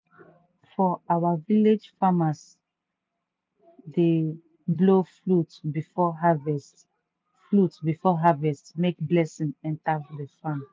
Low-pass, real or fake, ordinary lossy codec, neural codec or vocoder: none; real; none; none